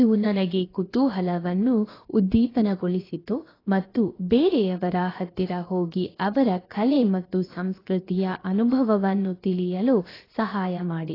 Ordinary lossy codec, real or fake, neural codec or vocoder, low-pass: AAC, 24 kbps; fake; codec, 16 kHz, about 1 kbps, DyCAST, with the encoder's durations; 5.4 kHz